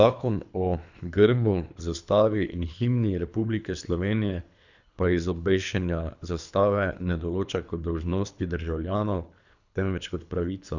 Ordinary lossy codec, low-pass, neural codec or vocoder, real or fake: none; 7.2 kHz; codec, 24 kHz, 3 kbps, HILCodec; fake